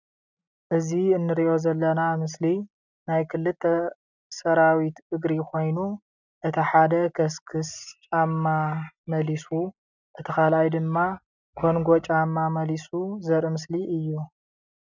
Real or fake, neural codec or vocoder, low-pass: real; none; 7.2 kHz